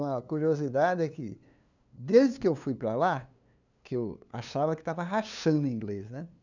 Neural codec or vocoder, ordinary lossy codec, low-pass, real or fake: codec, 16 kHz, 2 kbps, FunCodec, trained on LibriTTS, 25 frames a second; none; 7.2 kHz; fake